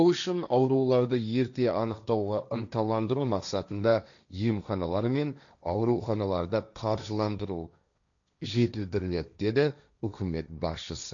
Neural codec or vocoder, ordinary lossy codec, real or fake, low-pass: codec, 16 kHz, 1.1 kbps, Voila-Tokenizer; none; fake; 7.2 kHz